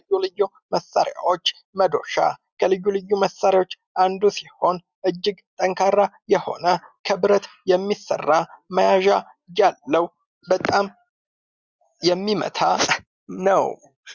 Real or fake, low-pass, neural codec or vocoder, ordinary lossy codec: real; 7.2 kHz; none; Opus, 64 kbps